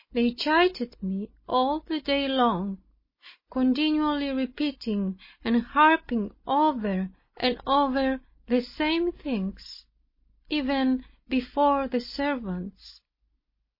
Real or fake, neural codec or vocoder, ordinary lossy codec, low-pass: real; none; MP3, 24 kbps; 5.4 kHz